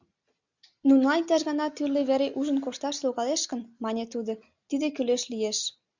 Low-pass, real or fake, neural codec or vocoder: 7.2 kHz; real; none